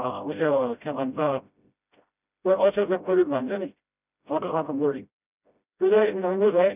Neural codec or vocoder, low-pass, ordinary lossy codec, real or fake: codec, 16 kHz, 0.5 kbps, FreqCodec, smaller model; 3.6 kHz; none; fake